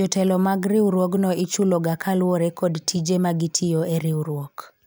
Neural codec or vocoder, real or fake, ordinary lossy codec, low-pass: none; real; none; none